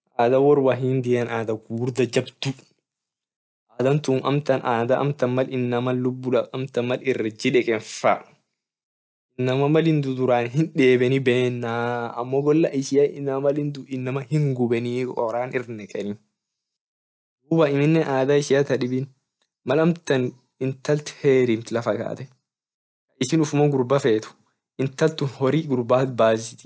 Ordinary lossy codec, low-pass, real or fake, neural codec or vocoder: none; none; real; none